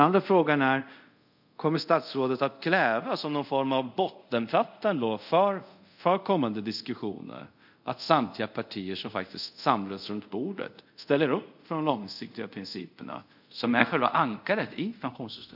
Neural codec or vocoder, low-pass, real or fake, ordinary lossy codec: codec, 24 kHz, 0.5 kbps, DualCodec; 5.4 kHz; fake; none